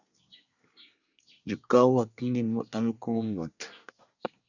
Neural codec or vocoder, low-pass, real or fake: codec, 24 kHz, 1 kbps, SNAC; 7.2 kHz; fake